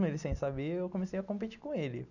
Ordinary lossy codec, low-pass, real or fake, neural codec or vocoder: none; 7.2 kHz; real; none